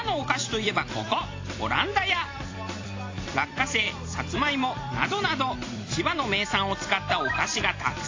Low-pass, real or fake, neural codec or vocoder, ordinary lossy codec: 7.2 kHz; fake; vocoder, 44.1 kHz, 128 mel bands every 256 samples, BigVGAN v2; AAC, 32 kbps